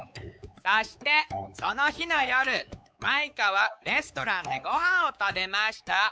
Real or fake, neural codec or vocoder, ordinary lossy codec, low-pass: fake; codec, 16 kHz, 4 kbps, X-Codec, WavLM features, trained on Multilingual LibriSpeech; none; none